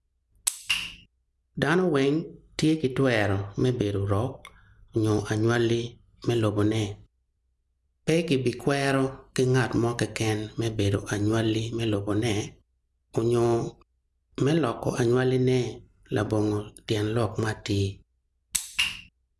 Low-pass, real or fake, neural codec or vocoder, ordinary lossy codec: none; real; none; none